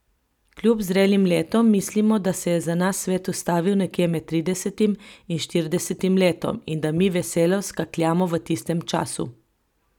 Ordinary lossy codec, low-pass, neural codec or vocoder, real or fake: none; 19.8 kHz; vocoder, 44.1 kHz, 128 mel bands every 256 samples, BigVGAN v2; fake